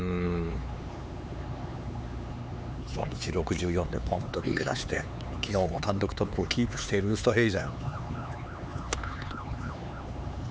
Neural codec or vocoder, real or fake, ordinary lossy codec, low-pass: codec, 16 kHz, 4 kbps, X-Codec, HuBERT features, trained on LibriSpeech; fake; none; none